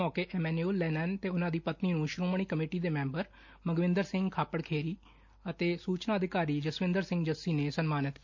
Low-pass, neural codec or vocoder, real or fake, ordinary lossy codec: 7.2 kHz; none; real; MP3, 48 kbps